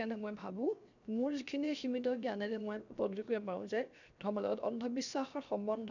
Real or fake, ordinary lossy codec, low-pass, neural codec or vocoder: fake; none; 7.2 kHz; codec, 16 kHz, 0.7 kbps, FocalCodec